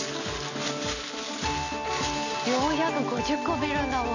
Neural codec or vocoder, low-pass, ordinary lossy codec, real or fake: none; 7.2 kHz; none; real